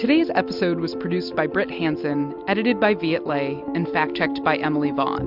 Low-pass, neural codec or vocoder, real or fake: 5.4 kHz; none; real